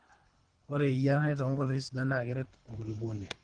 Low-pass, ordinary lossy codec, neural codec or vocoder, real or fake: 9.9 kHz; Opus, 24 kbps; codec, 24 kHz, 3 kbps, HILCodec; fake